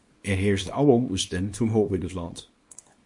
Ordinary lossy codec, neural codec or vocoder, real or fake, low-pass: MP3, 48 kbps; codec, 24 kHz, 0.9 kbps, WavTokenizer, small release; fake; 10.8 kHz